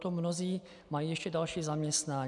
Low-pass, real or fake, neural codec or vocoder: 10.8 kHz; real; none